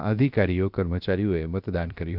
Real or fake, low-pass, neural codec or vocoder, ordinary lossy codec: fake; 5.4 kHz; codec, 16 kHz, 0.7 kbps, FocalCodec; none